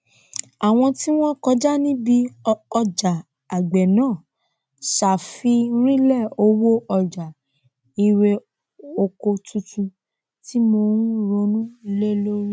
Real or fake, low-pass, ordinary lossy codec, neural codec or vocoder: real; none; none; none